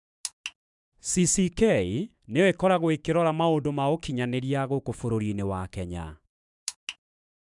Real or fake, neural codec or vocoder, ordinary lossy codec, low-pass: fake; autoencoder, 48 kHz, 128 numbers a frame, DAC-VAE, trained on Japanese speech; none; 10.8 kHz